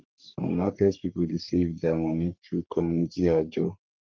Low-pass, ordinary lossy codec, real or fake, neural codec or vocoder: 7.2 kHz; Opus, 24 kbps; fake; codec, 44.1 kHz, 3.4 kbps, Pupu-Codec